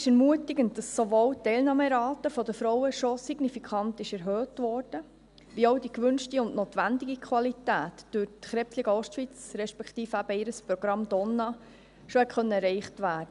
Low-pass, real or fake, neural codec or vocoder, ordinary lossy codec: 10.8 kHz; real; none; none